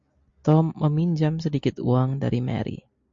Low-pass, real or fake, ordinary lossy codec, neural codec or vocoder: 7.2 kHz; real; MP3, 48 kbps; none